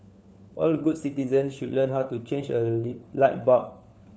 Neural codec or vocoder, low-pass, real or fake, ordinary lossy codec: codec, 16 kHz, 4 kbps, FunCodec, trained on LibriTTS, 50 frames a second; none; fake; none